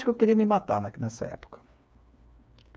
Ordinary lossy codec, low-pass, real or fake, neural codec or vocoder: none; none; fake; codec, 16 kHz, 4 kbps, FreqCodec, smaller model